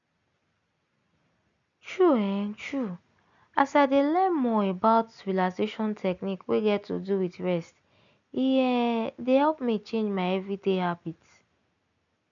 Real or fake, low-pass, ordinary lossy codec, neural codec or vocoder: real; 7.2 kHz; none; none